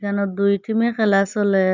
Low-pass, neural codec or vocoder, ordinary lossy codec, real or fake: 7.2 kHz; none; none; real